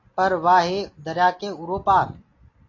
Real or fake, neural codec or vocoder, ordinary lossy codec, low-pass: real; none; AAC, 32 kbps; 7.2 kHz